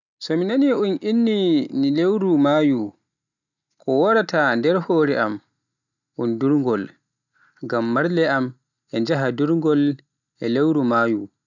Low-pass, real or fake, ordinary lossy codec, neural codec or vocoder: 7.2 kHz; real; none; none